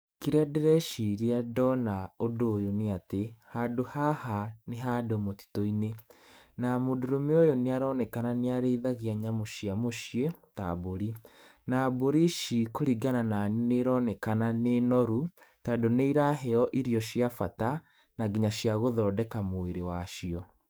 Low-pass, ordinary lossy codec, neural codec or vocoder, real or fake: none; none; codec, 44.1 kHz, 7.8 kbps, DAC; fake